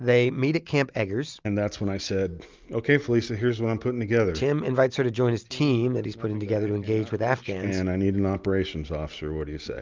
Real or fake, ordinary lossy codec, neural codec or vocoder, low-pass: real; Opus, 24 kbps; none; 7.2 kHz